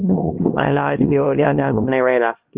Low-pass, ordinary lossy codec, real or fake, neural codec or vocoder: 3.6 kHz; Opus, 32 kbps; fake; codec, 16 kHz, 1 kbps, X-Codec, HuBERT features, trained on LibriSpeech